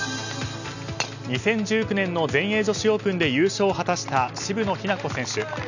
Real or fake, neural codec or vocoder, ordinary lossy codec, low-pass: real; none; none; 7.2 kHz